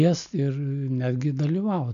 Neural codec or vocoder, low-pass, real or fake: none; 7.2 kHz; real